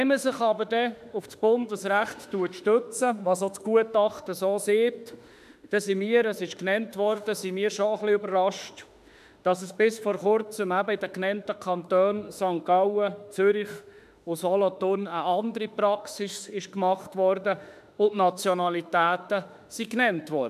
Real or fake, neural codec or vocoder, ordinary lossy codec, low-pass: fake; autoencoder, 48 kHz, 32 numbers a frame, DAC-VAE, trained on Japanese speech; none; 14.4 kHz